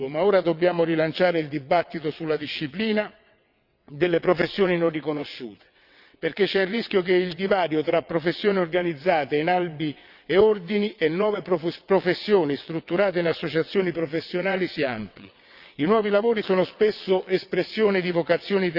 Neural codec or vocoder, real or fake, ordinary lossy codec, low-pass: vocoder, 22.05 kHz, 80 mel bands, WaveNeXt; fake; Opus, 64 kbps; 5.4 kHz